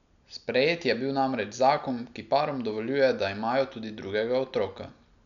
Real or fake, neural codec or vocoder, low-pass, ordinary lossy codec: real; none; 7.2 kHz; none